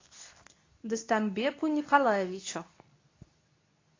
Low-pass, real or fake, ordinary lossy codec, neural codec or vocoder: 7.2 kHz; fake; AAC, 32 kbps; codec, 24 kHz, 0.9 kbps, WavTokenizer, medium speech release version 2